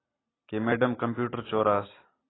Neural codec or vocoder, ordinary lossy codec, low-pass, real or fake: none; AAC, 16 kbps; 7.2 kHz; real